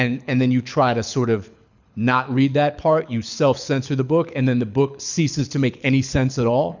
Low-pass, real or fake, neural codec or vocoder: 7.2 kHz; fake; codec, 24 kHz, 6 kbps, HILCodec